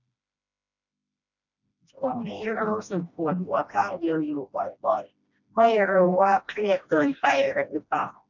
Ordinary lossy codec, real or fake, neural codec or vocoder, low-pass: none; fake; codec, 16 kHz, 1 kbps, FreqCodec, smaller model; 7.2 kHz